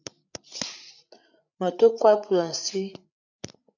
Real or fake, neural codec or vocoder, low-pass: fake; codec, 16 kHz, 8 kbps, FreqCodec, larger model; 7.2 kHz